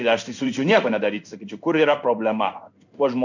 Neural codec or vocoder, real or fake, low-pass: codec, 16 kHz in and 24 kHz out, 1 kbps, XY-Tokenizer; fake; 7.2 kHz